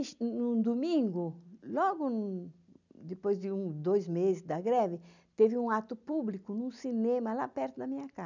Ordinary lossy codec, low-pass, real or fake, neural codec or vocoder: none; 7.2 kHz; real; none